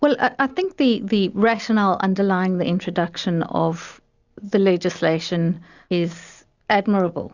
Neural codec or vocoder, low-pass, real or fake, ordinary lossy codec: none; 7.2 kHz; real; Opus, 64 kbps